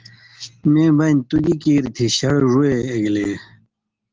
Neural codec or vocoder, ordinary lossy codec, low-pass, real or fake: none; Opus, 16 kbps; 7.2 kHz; real